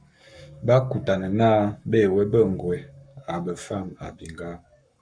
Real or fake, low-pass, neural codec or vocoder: fake; 9.9 kHz; codec, 44.1 kHz, 7.8 kbps, Pupu-Codec